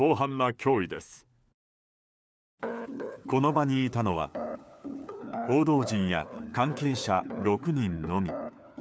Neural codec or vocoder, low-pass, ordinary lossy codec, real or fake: codec, 16 kHz, 4 kbps, FunCodec, trained on LibriTTS, 50 frames a second; none; none; fake